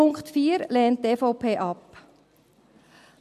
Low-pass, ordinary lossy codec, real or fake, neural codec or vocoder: 14.4 kHz; MP3, 64 kbps; real; none